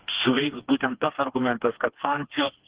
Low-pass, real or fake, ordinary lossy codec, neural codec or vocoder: 3.6 kHz; fake; Opus, 16 kbps; codec, 16 kHz, 2 kbps, FreqCodec, smaller model